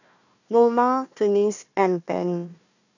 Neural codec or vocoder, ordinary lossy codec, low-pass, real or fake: codec, 16 kHz, 1 kbps, FunCodec, trained on Chinese and English, 50 frames a second; none; 7.2 kHz; fake